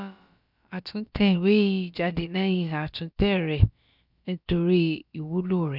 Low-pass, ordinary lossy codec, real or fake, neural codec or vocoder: 5.4 kHz; none; fake; codec, 16 kHz, about 1 kbps, DyCAST, with the encoder's durations